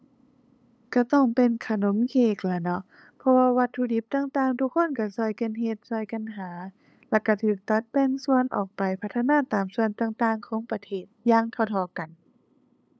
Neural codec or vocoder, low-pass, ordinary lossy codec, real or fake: codec, 16 kHz, 8 kbps, FunCodec, trained on LibriTTS, 25 frames a second; none; none; fake